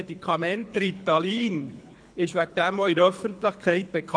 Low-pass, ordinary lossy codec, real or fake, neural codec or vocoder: 9.9 kHz; AAC, 64 kbps; fake; codec, 24 kHz, 3 kbps, HILCodec